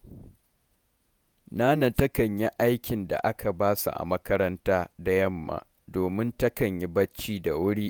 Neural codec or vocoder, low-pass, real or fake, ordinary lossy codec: vocoder, 48 kHz, 128 mel bands, Vocos; none; fake; none